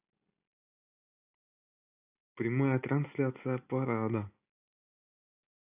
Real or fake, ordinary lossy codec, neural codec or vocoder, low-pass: real; AAC, 32 kbps; none; 3.6 kHz